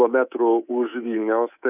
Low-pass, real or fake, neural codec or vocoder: 3.6 kHz; fake; codec, 24 kHz, 3.1 kbps, DualCodec